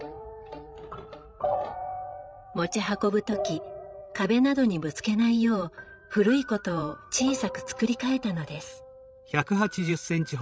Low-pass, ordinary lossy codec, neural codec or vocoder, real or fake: none; none; codec, 16 kHz, 16 kbps, FreqCodec, larger model; fake